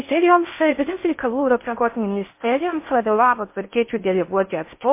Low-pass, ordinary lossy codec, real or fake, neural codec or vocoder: 3.6 kHz; MP3, 24 kbps; fake; codec, 16 kHz in and 24 kHz out, 0.6 kbps, FocalCodec, streaming, 4096 codes